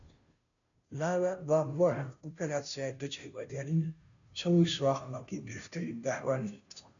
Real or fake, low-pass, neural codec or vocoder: fake; 7.2 kHz; codec, 16 kHz, 0.5 kbps, FunCodec, trained on Chinese and English, 25 frames a second